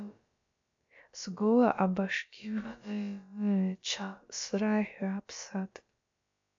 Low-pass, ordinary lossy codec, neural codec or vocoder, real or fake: 7.2 kHz; AAC, 48 kbps; codec, 16 kHz, about 1 kbps, DyCAST, with the encoder's durations; fake